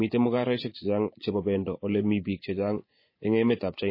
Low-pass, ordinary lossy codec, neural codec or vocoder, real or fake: 5.4 kHz; MP3, 24 kbps; vocoder, 24 kHz, 100 mel bands, Vocos; fake